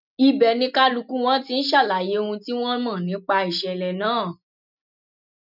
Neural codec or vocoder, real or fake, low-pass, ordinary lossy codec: none; real; 5.4 kHz; none